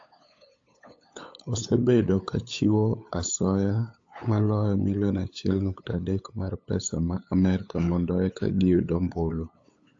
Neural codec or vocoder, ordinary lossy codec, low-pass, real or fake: codec, 16 kHz, 8 kbps, FunCodec, trained on LibriTTS, 25 frames a second; AAC, 48 kbps; 7.2 kHz; fake